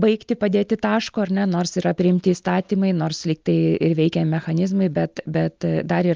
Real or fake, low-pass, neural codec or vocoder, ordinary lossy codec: real; 7.2 kHz; none; Opus, 24 kbps